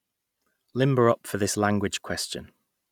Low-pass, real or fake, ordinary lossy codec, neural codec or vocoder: 19.8 kHz; real; none; none